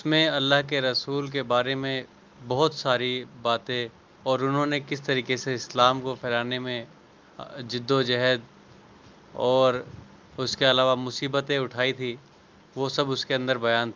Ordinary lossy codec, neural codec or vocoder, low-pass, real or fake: Opus, 24 kbps; none; 7.2 kHz; real